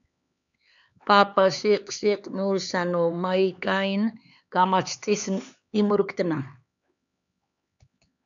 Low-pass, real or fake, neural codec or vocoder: 7.2 kHz; fake; codec, 16 kHz, 4 kbps, X-Codec, HuBERT features, trained on LibriSpeech